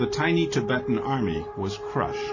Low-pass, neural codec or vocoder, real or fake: 7.2 kHz; none; real